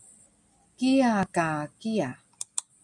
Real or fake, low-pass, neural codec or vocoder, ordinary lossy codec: real; 10.8 kHz; none; MP3, 96 kbps